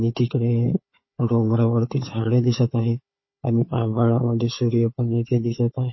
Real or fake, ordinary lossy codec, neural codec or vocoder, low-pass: fake; MP3, 24 kbps; codec, 16 kHz, 4 kbps, FunCodec, trained on Chinese and English, 50 frames a second; 7.2 kHz